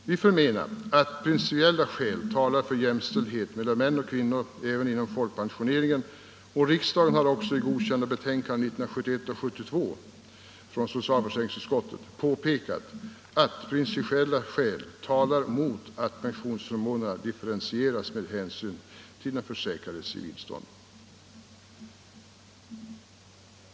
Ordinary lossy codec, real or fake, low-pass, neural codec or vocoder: none; real; none; none